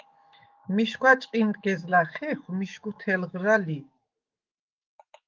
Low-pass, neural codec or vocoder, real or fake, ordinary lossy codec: 7.2 kHz; autoencoder, 48 kHz, 128 numbers a frame, DAC-VAE, trained on Japanese speech; fake; Opus, 24 kbps